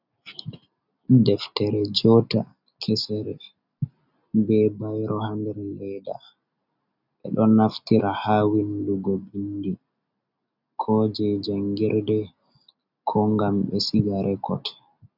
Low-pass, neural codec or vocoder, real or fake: 5.4 kHz; none; real